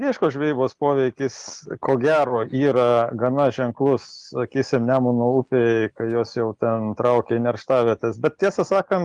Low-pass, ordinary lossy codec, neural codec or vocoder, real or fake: 10.8 kHz; Opus, 24 kbps; none; real